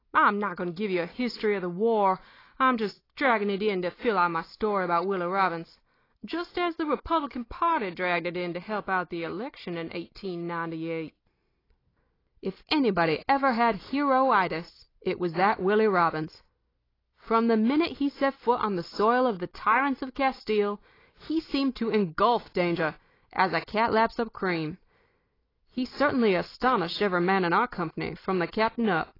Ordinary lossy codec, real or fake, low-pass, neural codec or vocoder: AAC, 24 kbps; real; 5.4 kHz; none